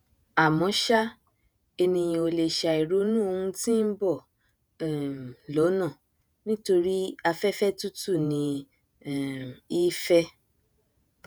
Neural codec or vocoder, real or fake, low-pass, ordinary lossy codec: vocoder, 48 kHz, 128 mel bands, Vocos; fake; none; none